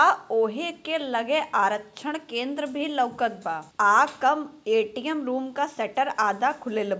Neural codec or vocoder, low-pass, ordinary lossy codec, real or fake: none; none; none; real